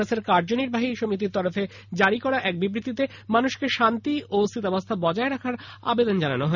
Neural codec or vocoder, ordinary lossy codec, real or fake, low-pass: none; none; real; 7.2 kHz